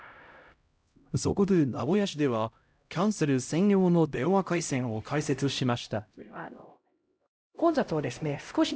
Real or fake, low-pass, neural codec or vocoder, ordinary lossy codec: fake; none; codec, 16 kHz, 0.5 kbps, X-Codec, HuBERT features, trained on LibriSpeech; none